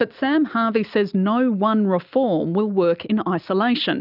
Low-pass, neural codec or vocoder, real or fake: 5.4 kHz; none; real